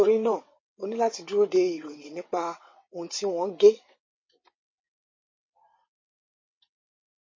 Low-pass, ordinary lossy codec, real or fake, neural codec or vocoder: 7.2 kHz; MP3, 32 kbps; fake; vocoder, 22.05 kHz, 80 mel bands, WaveNeXt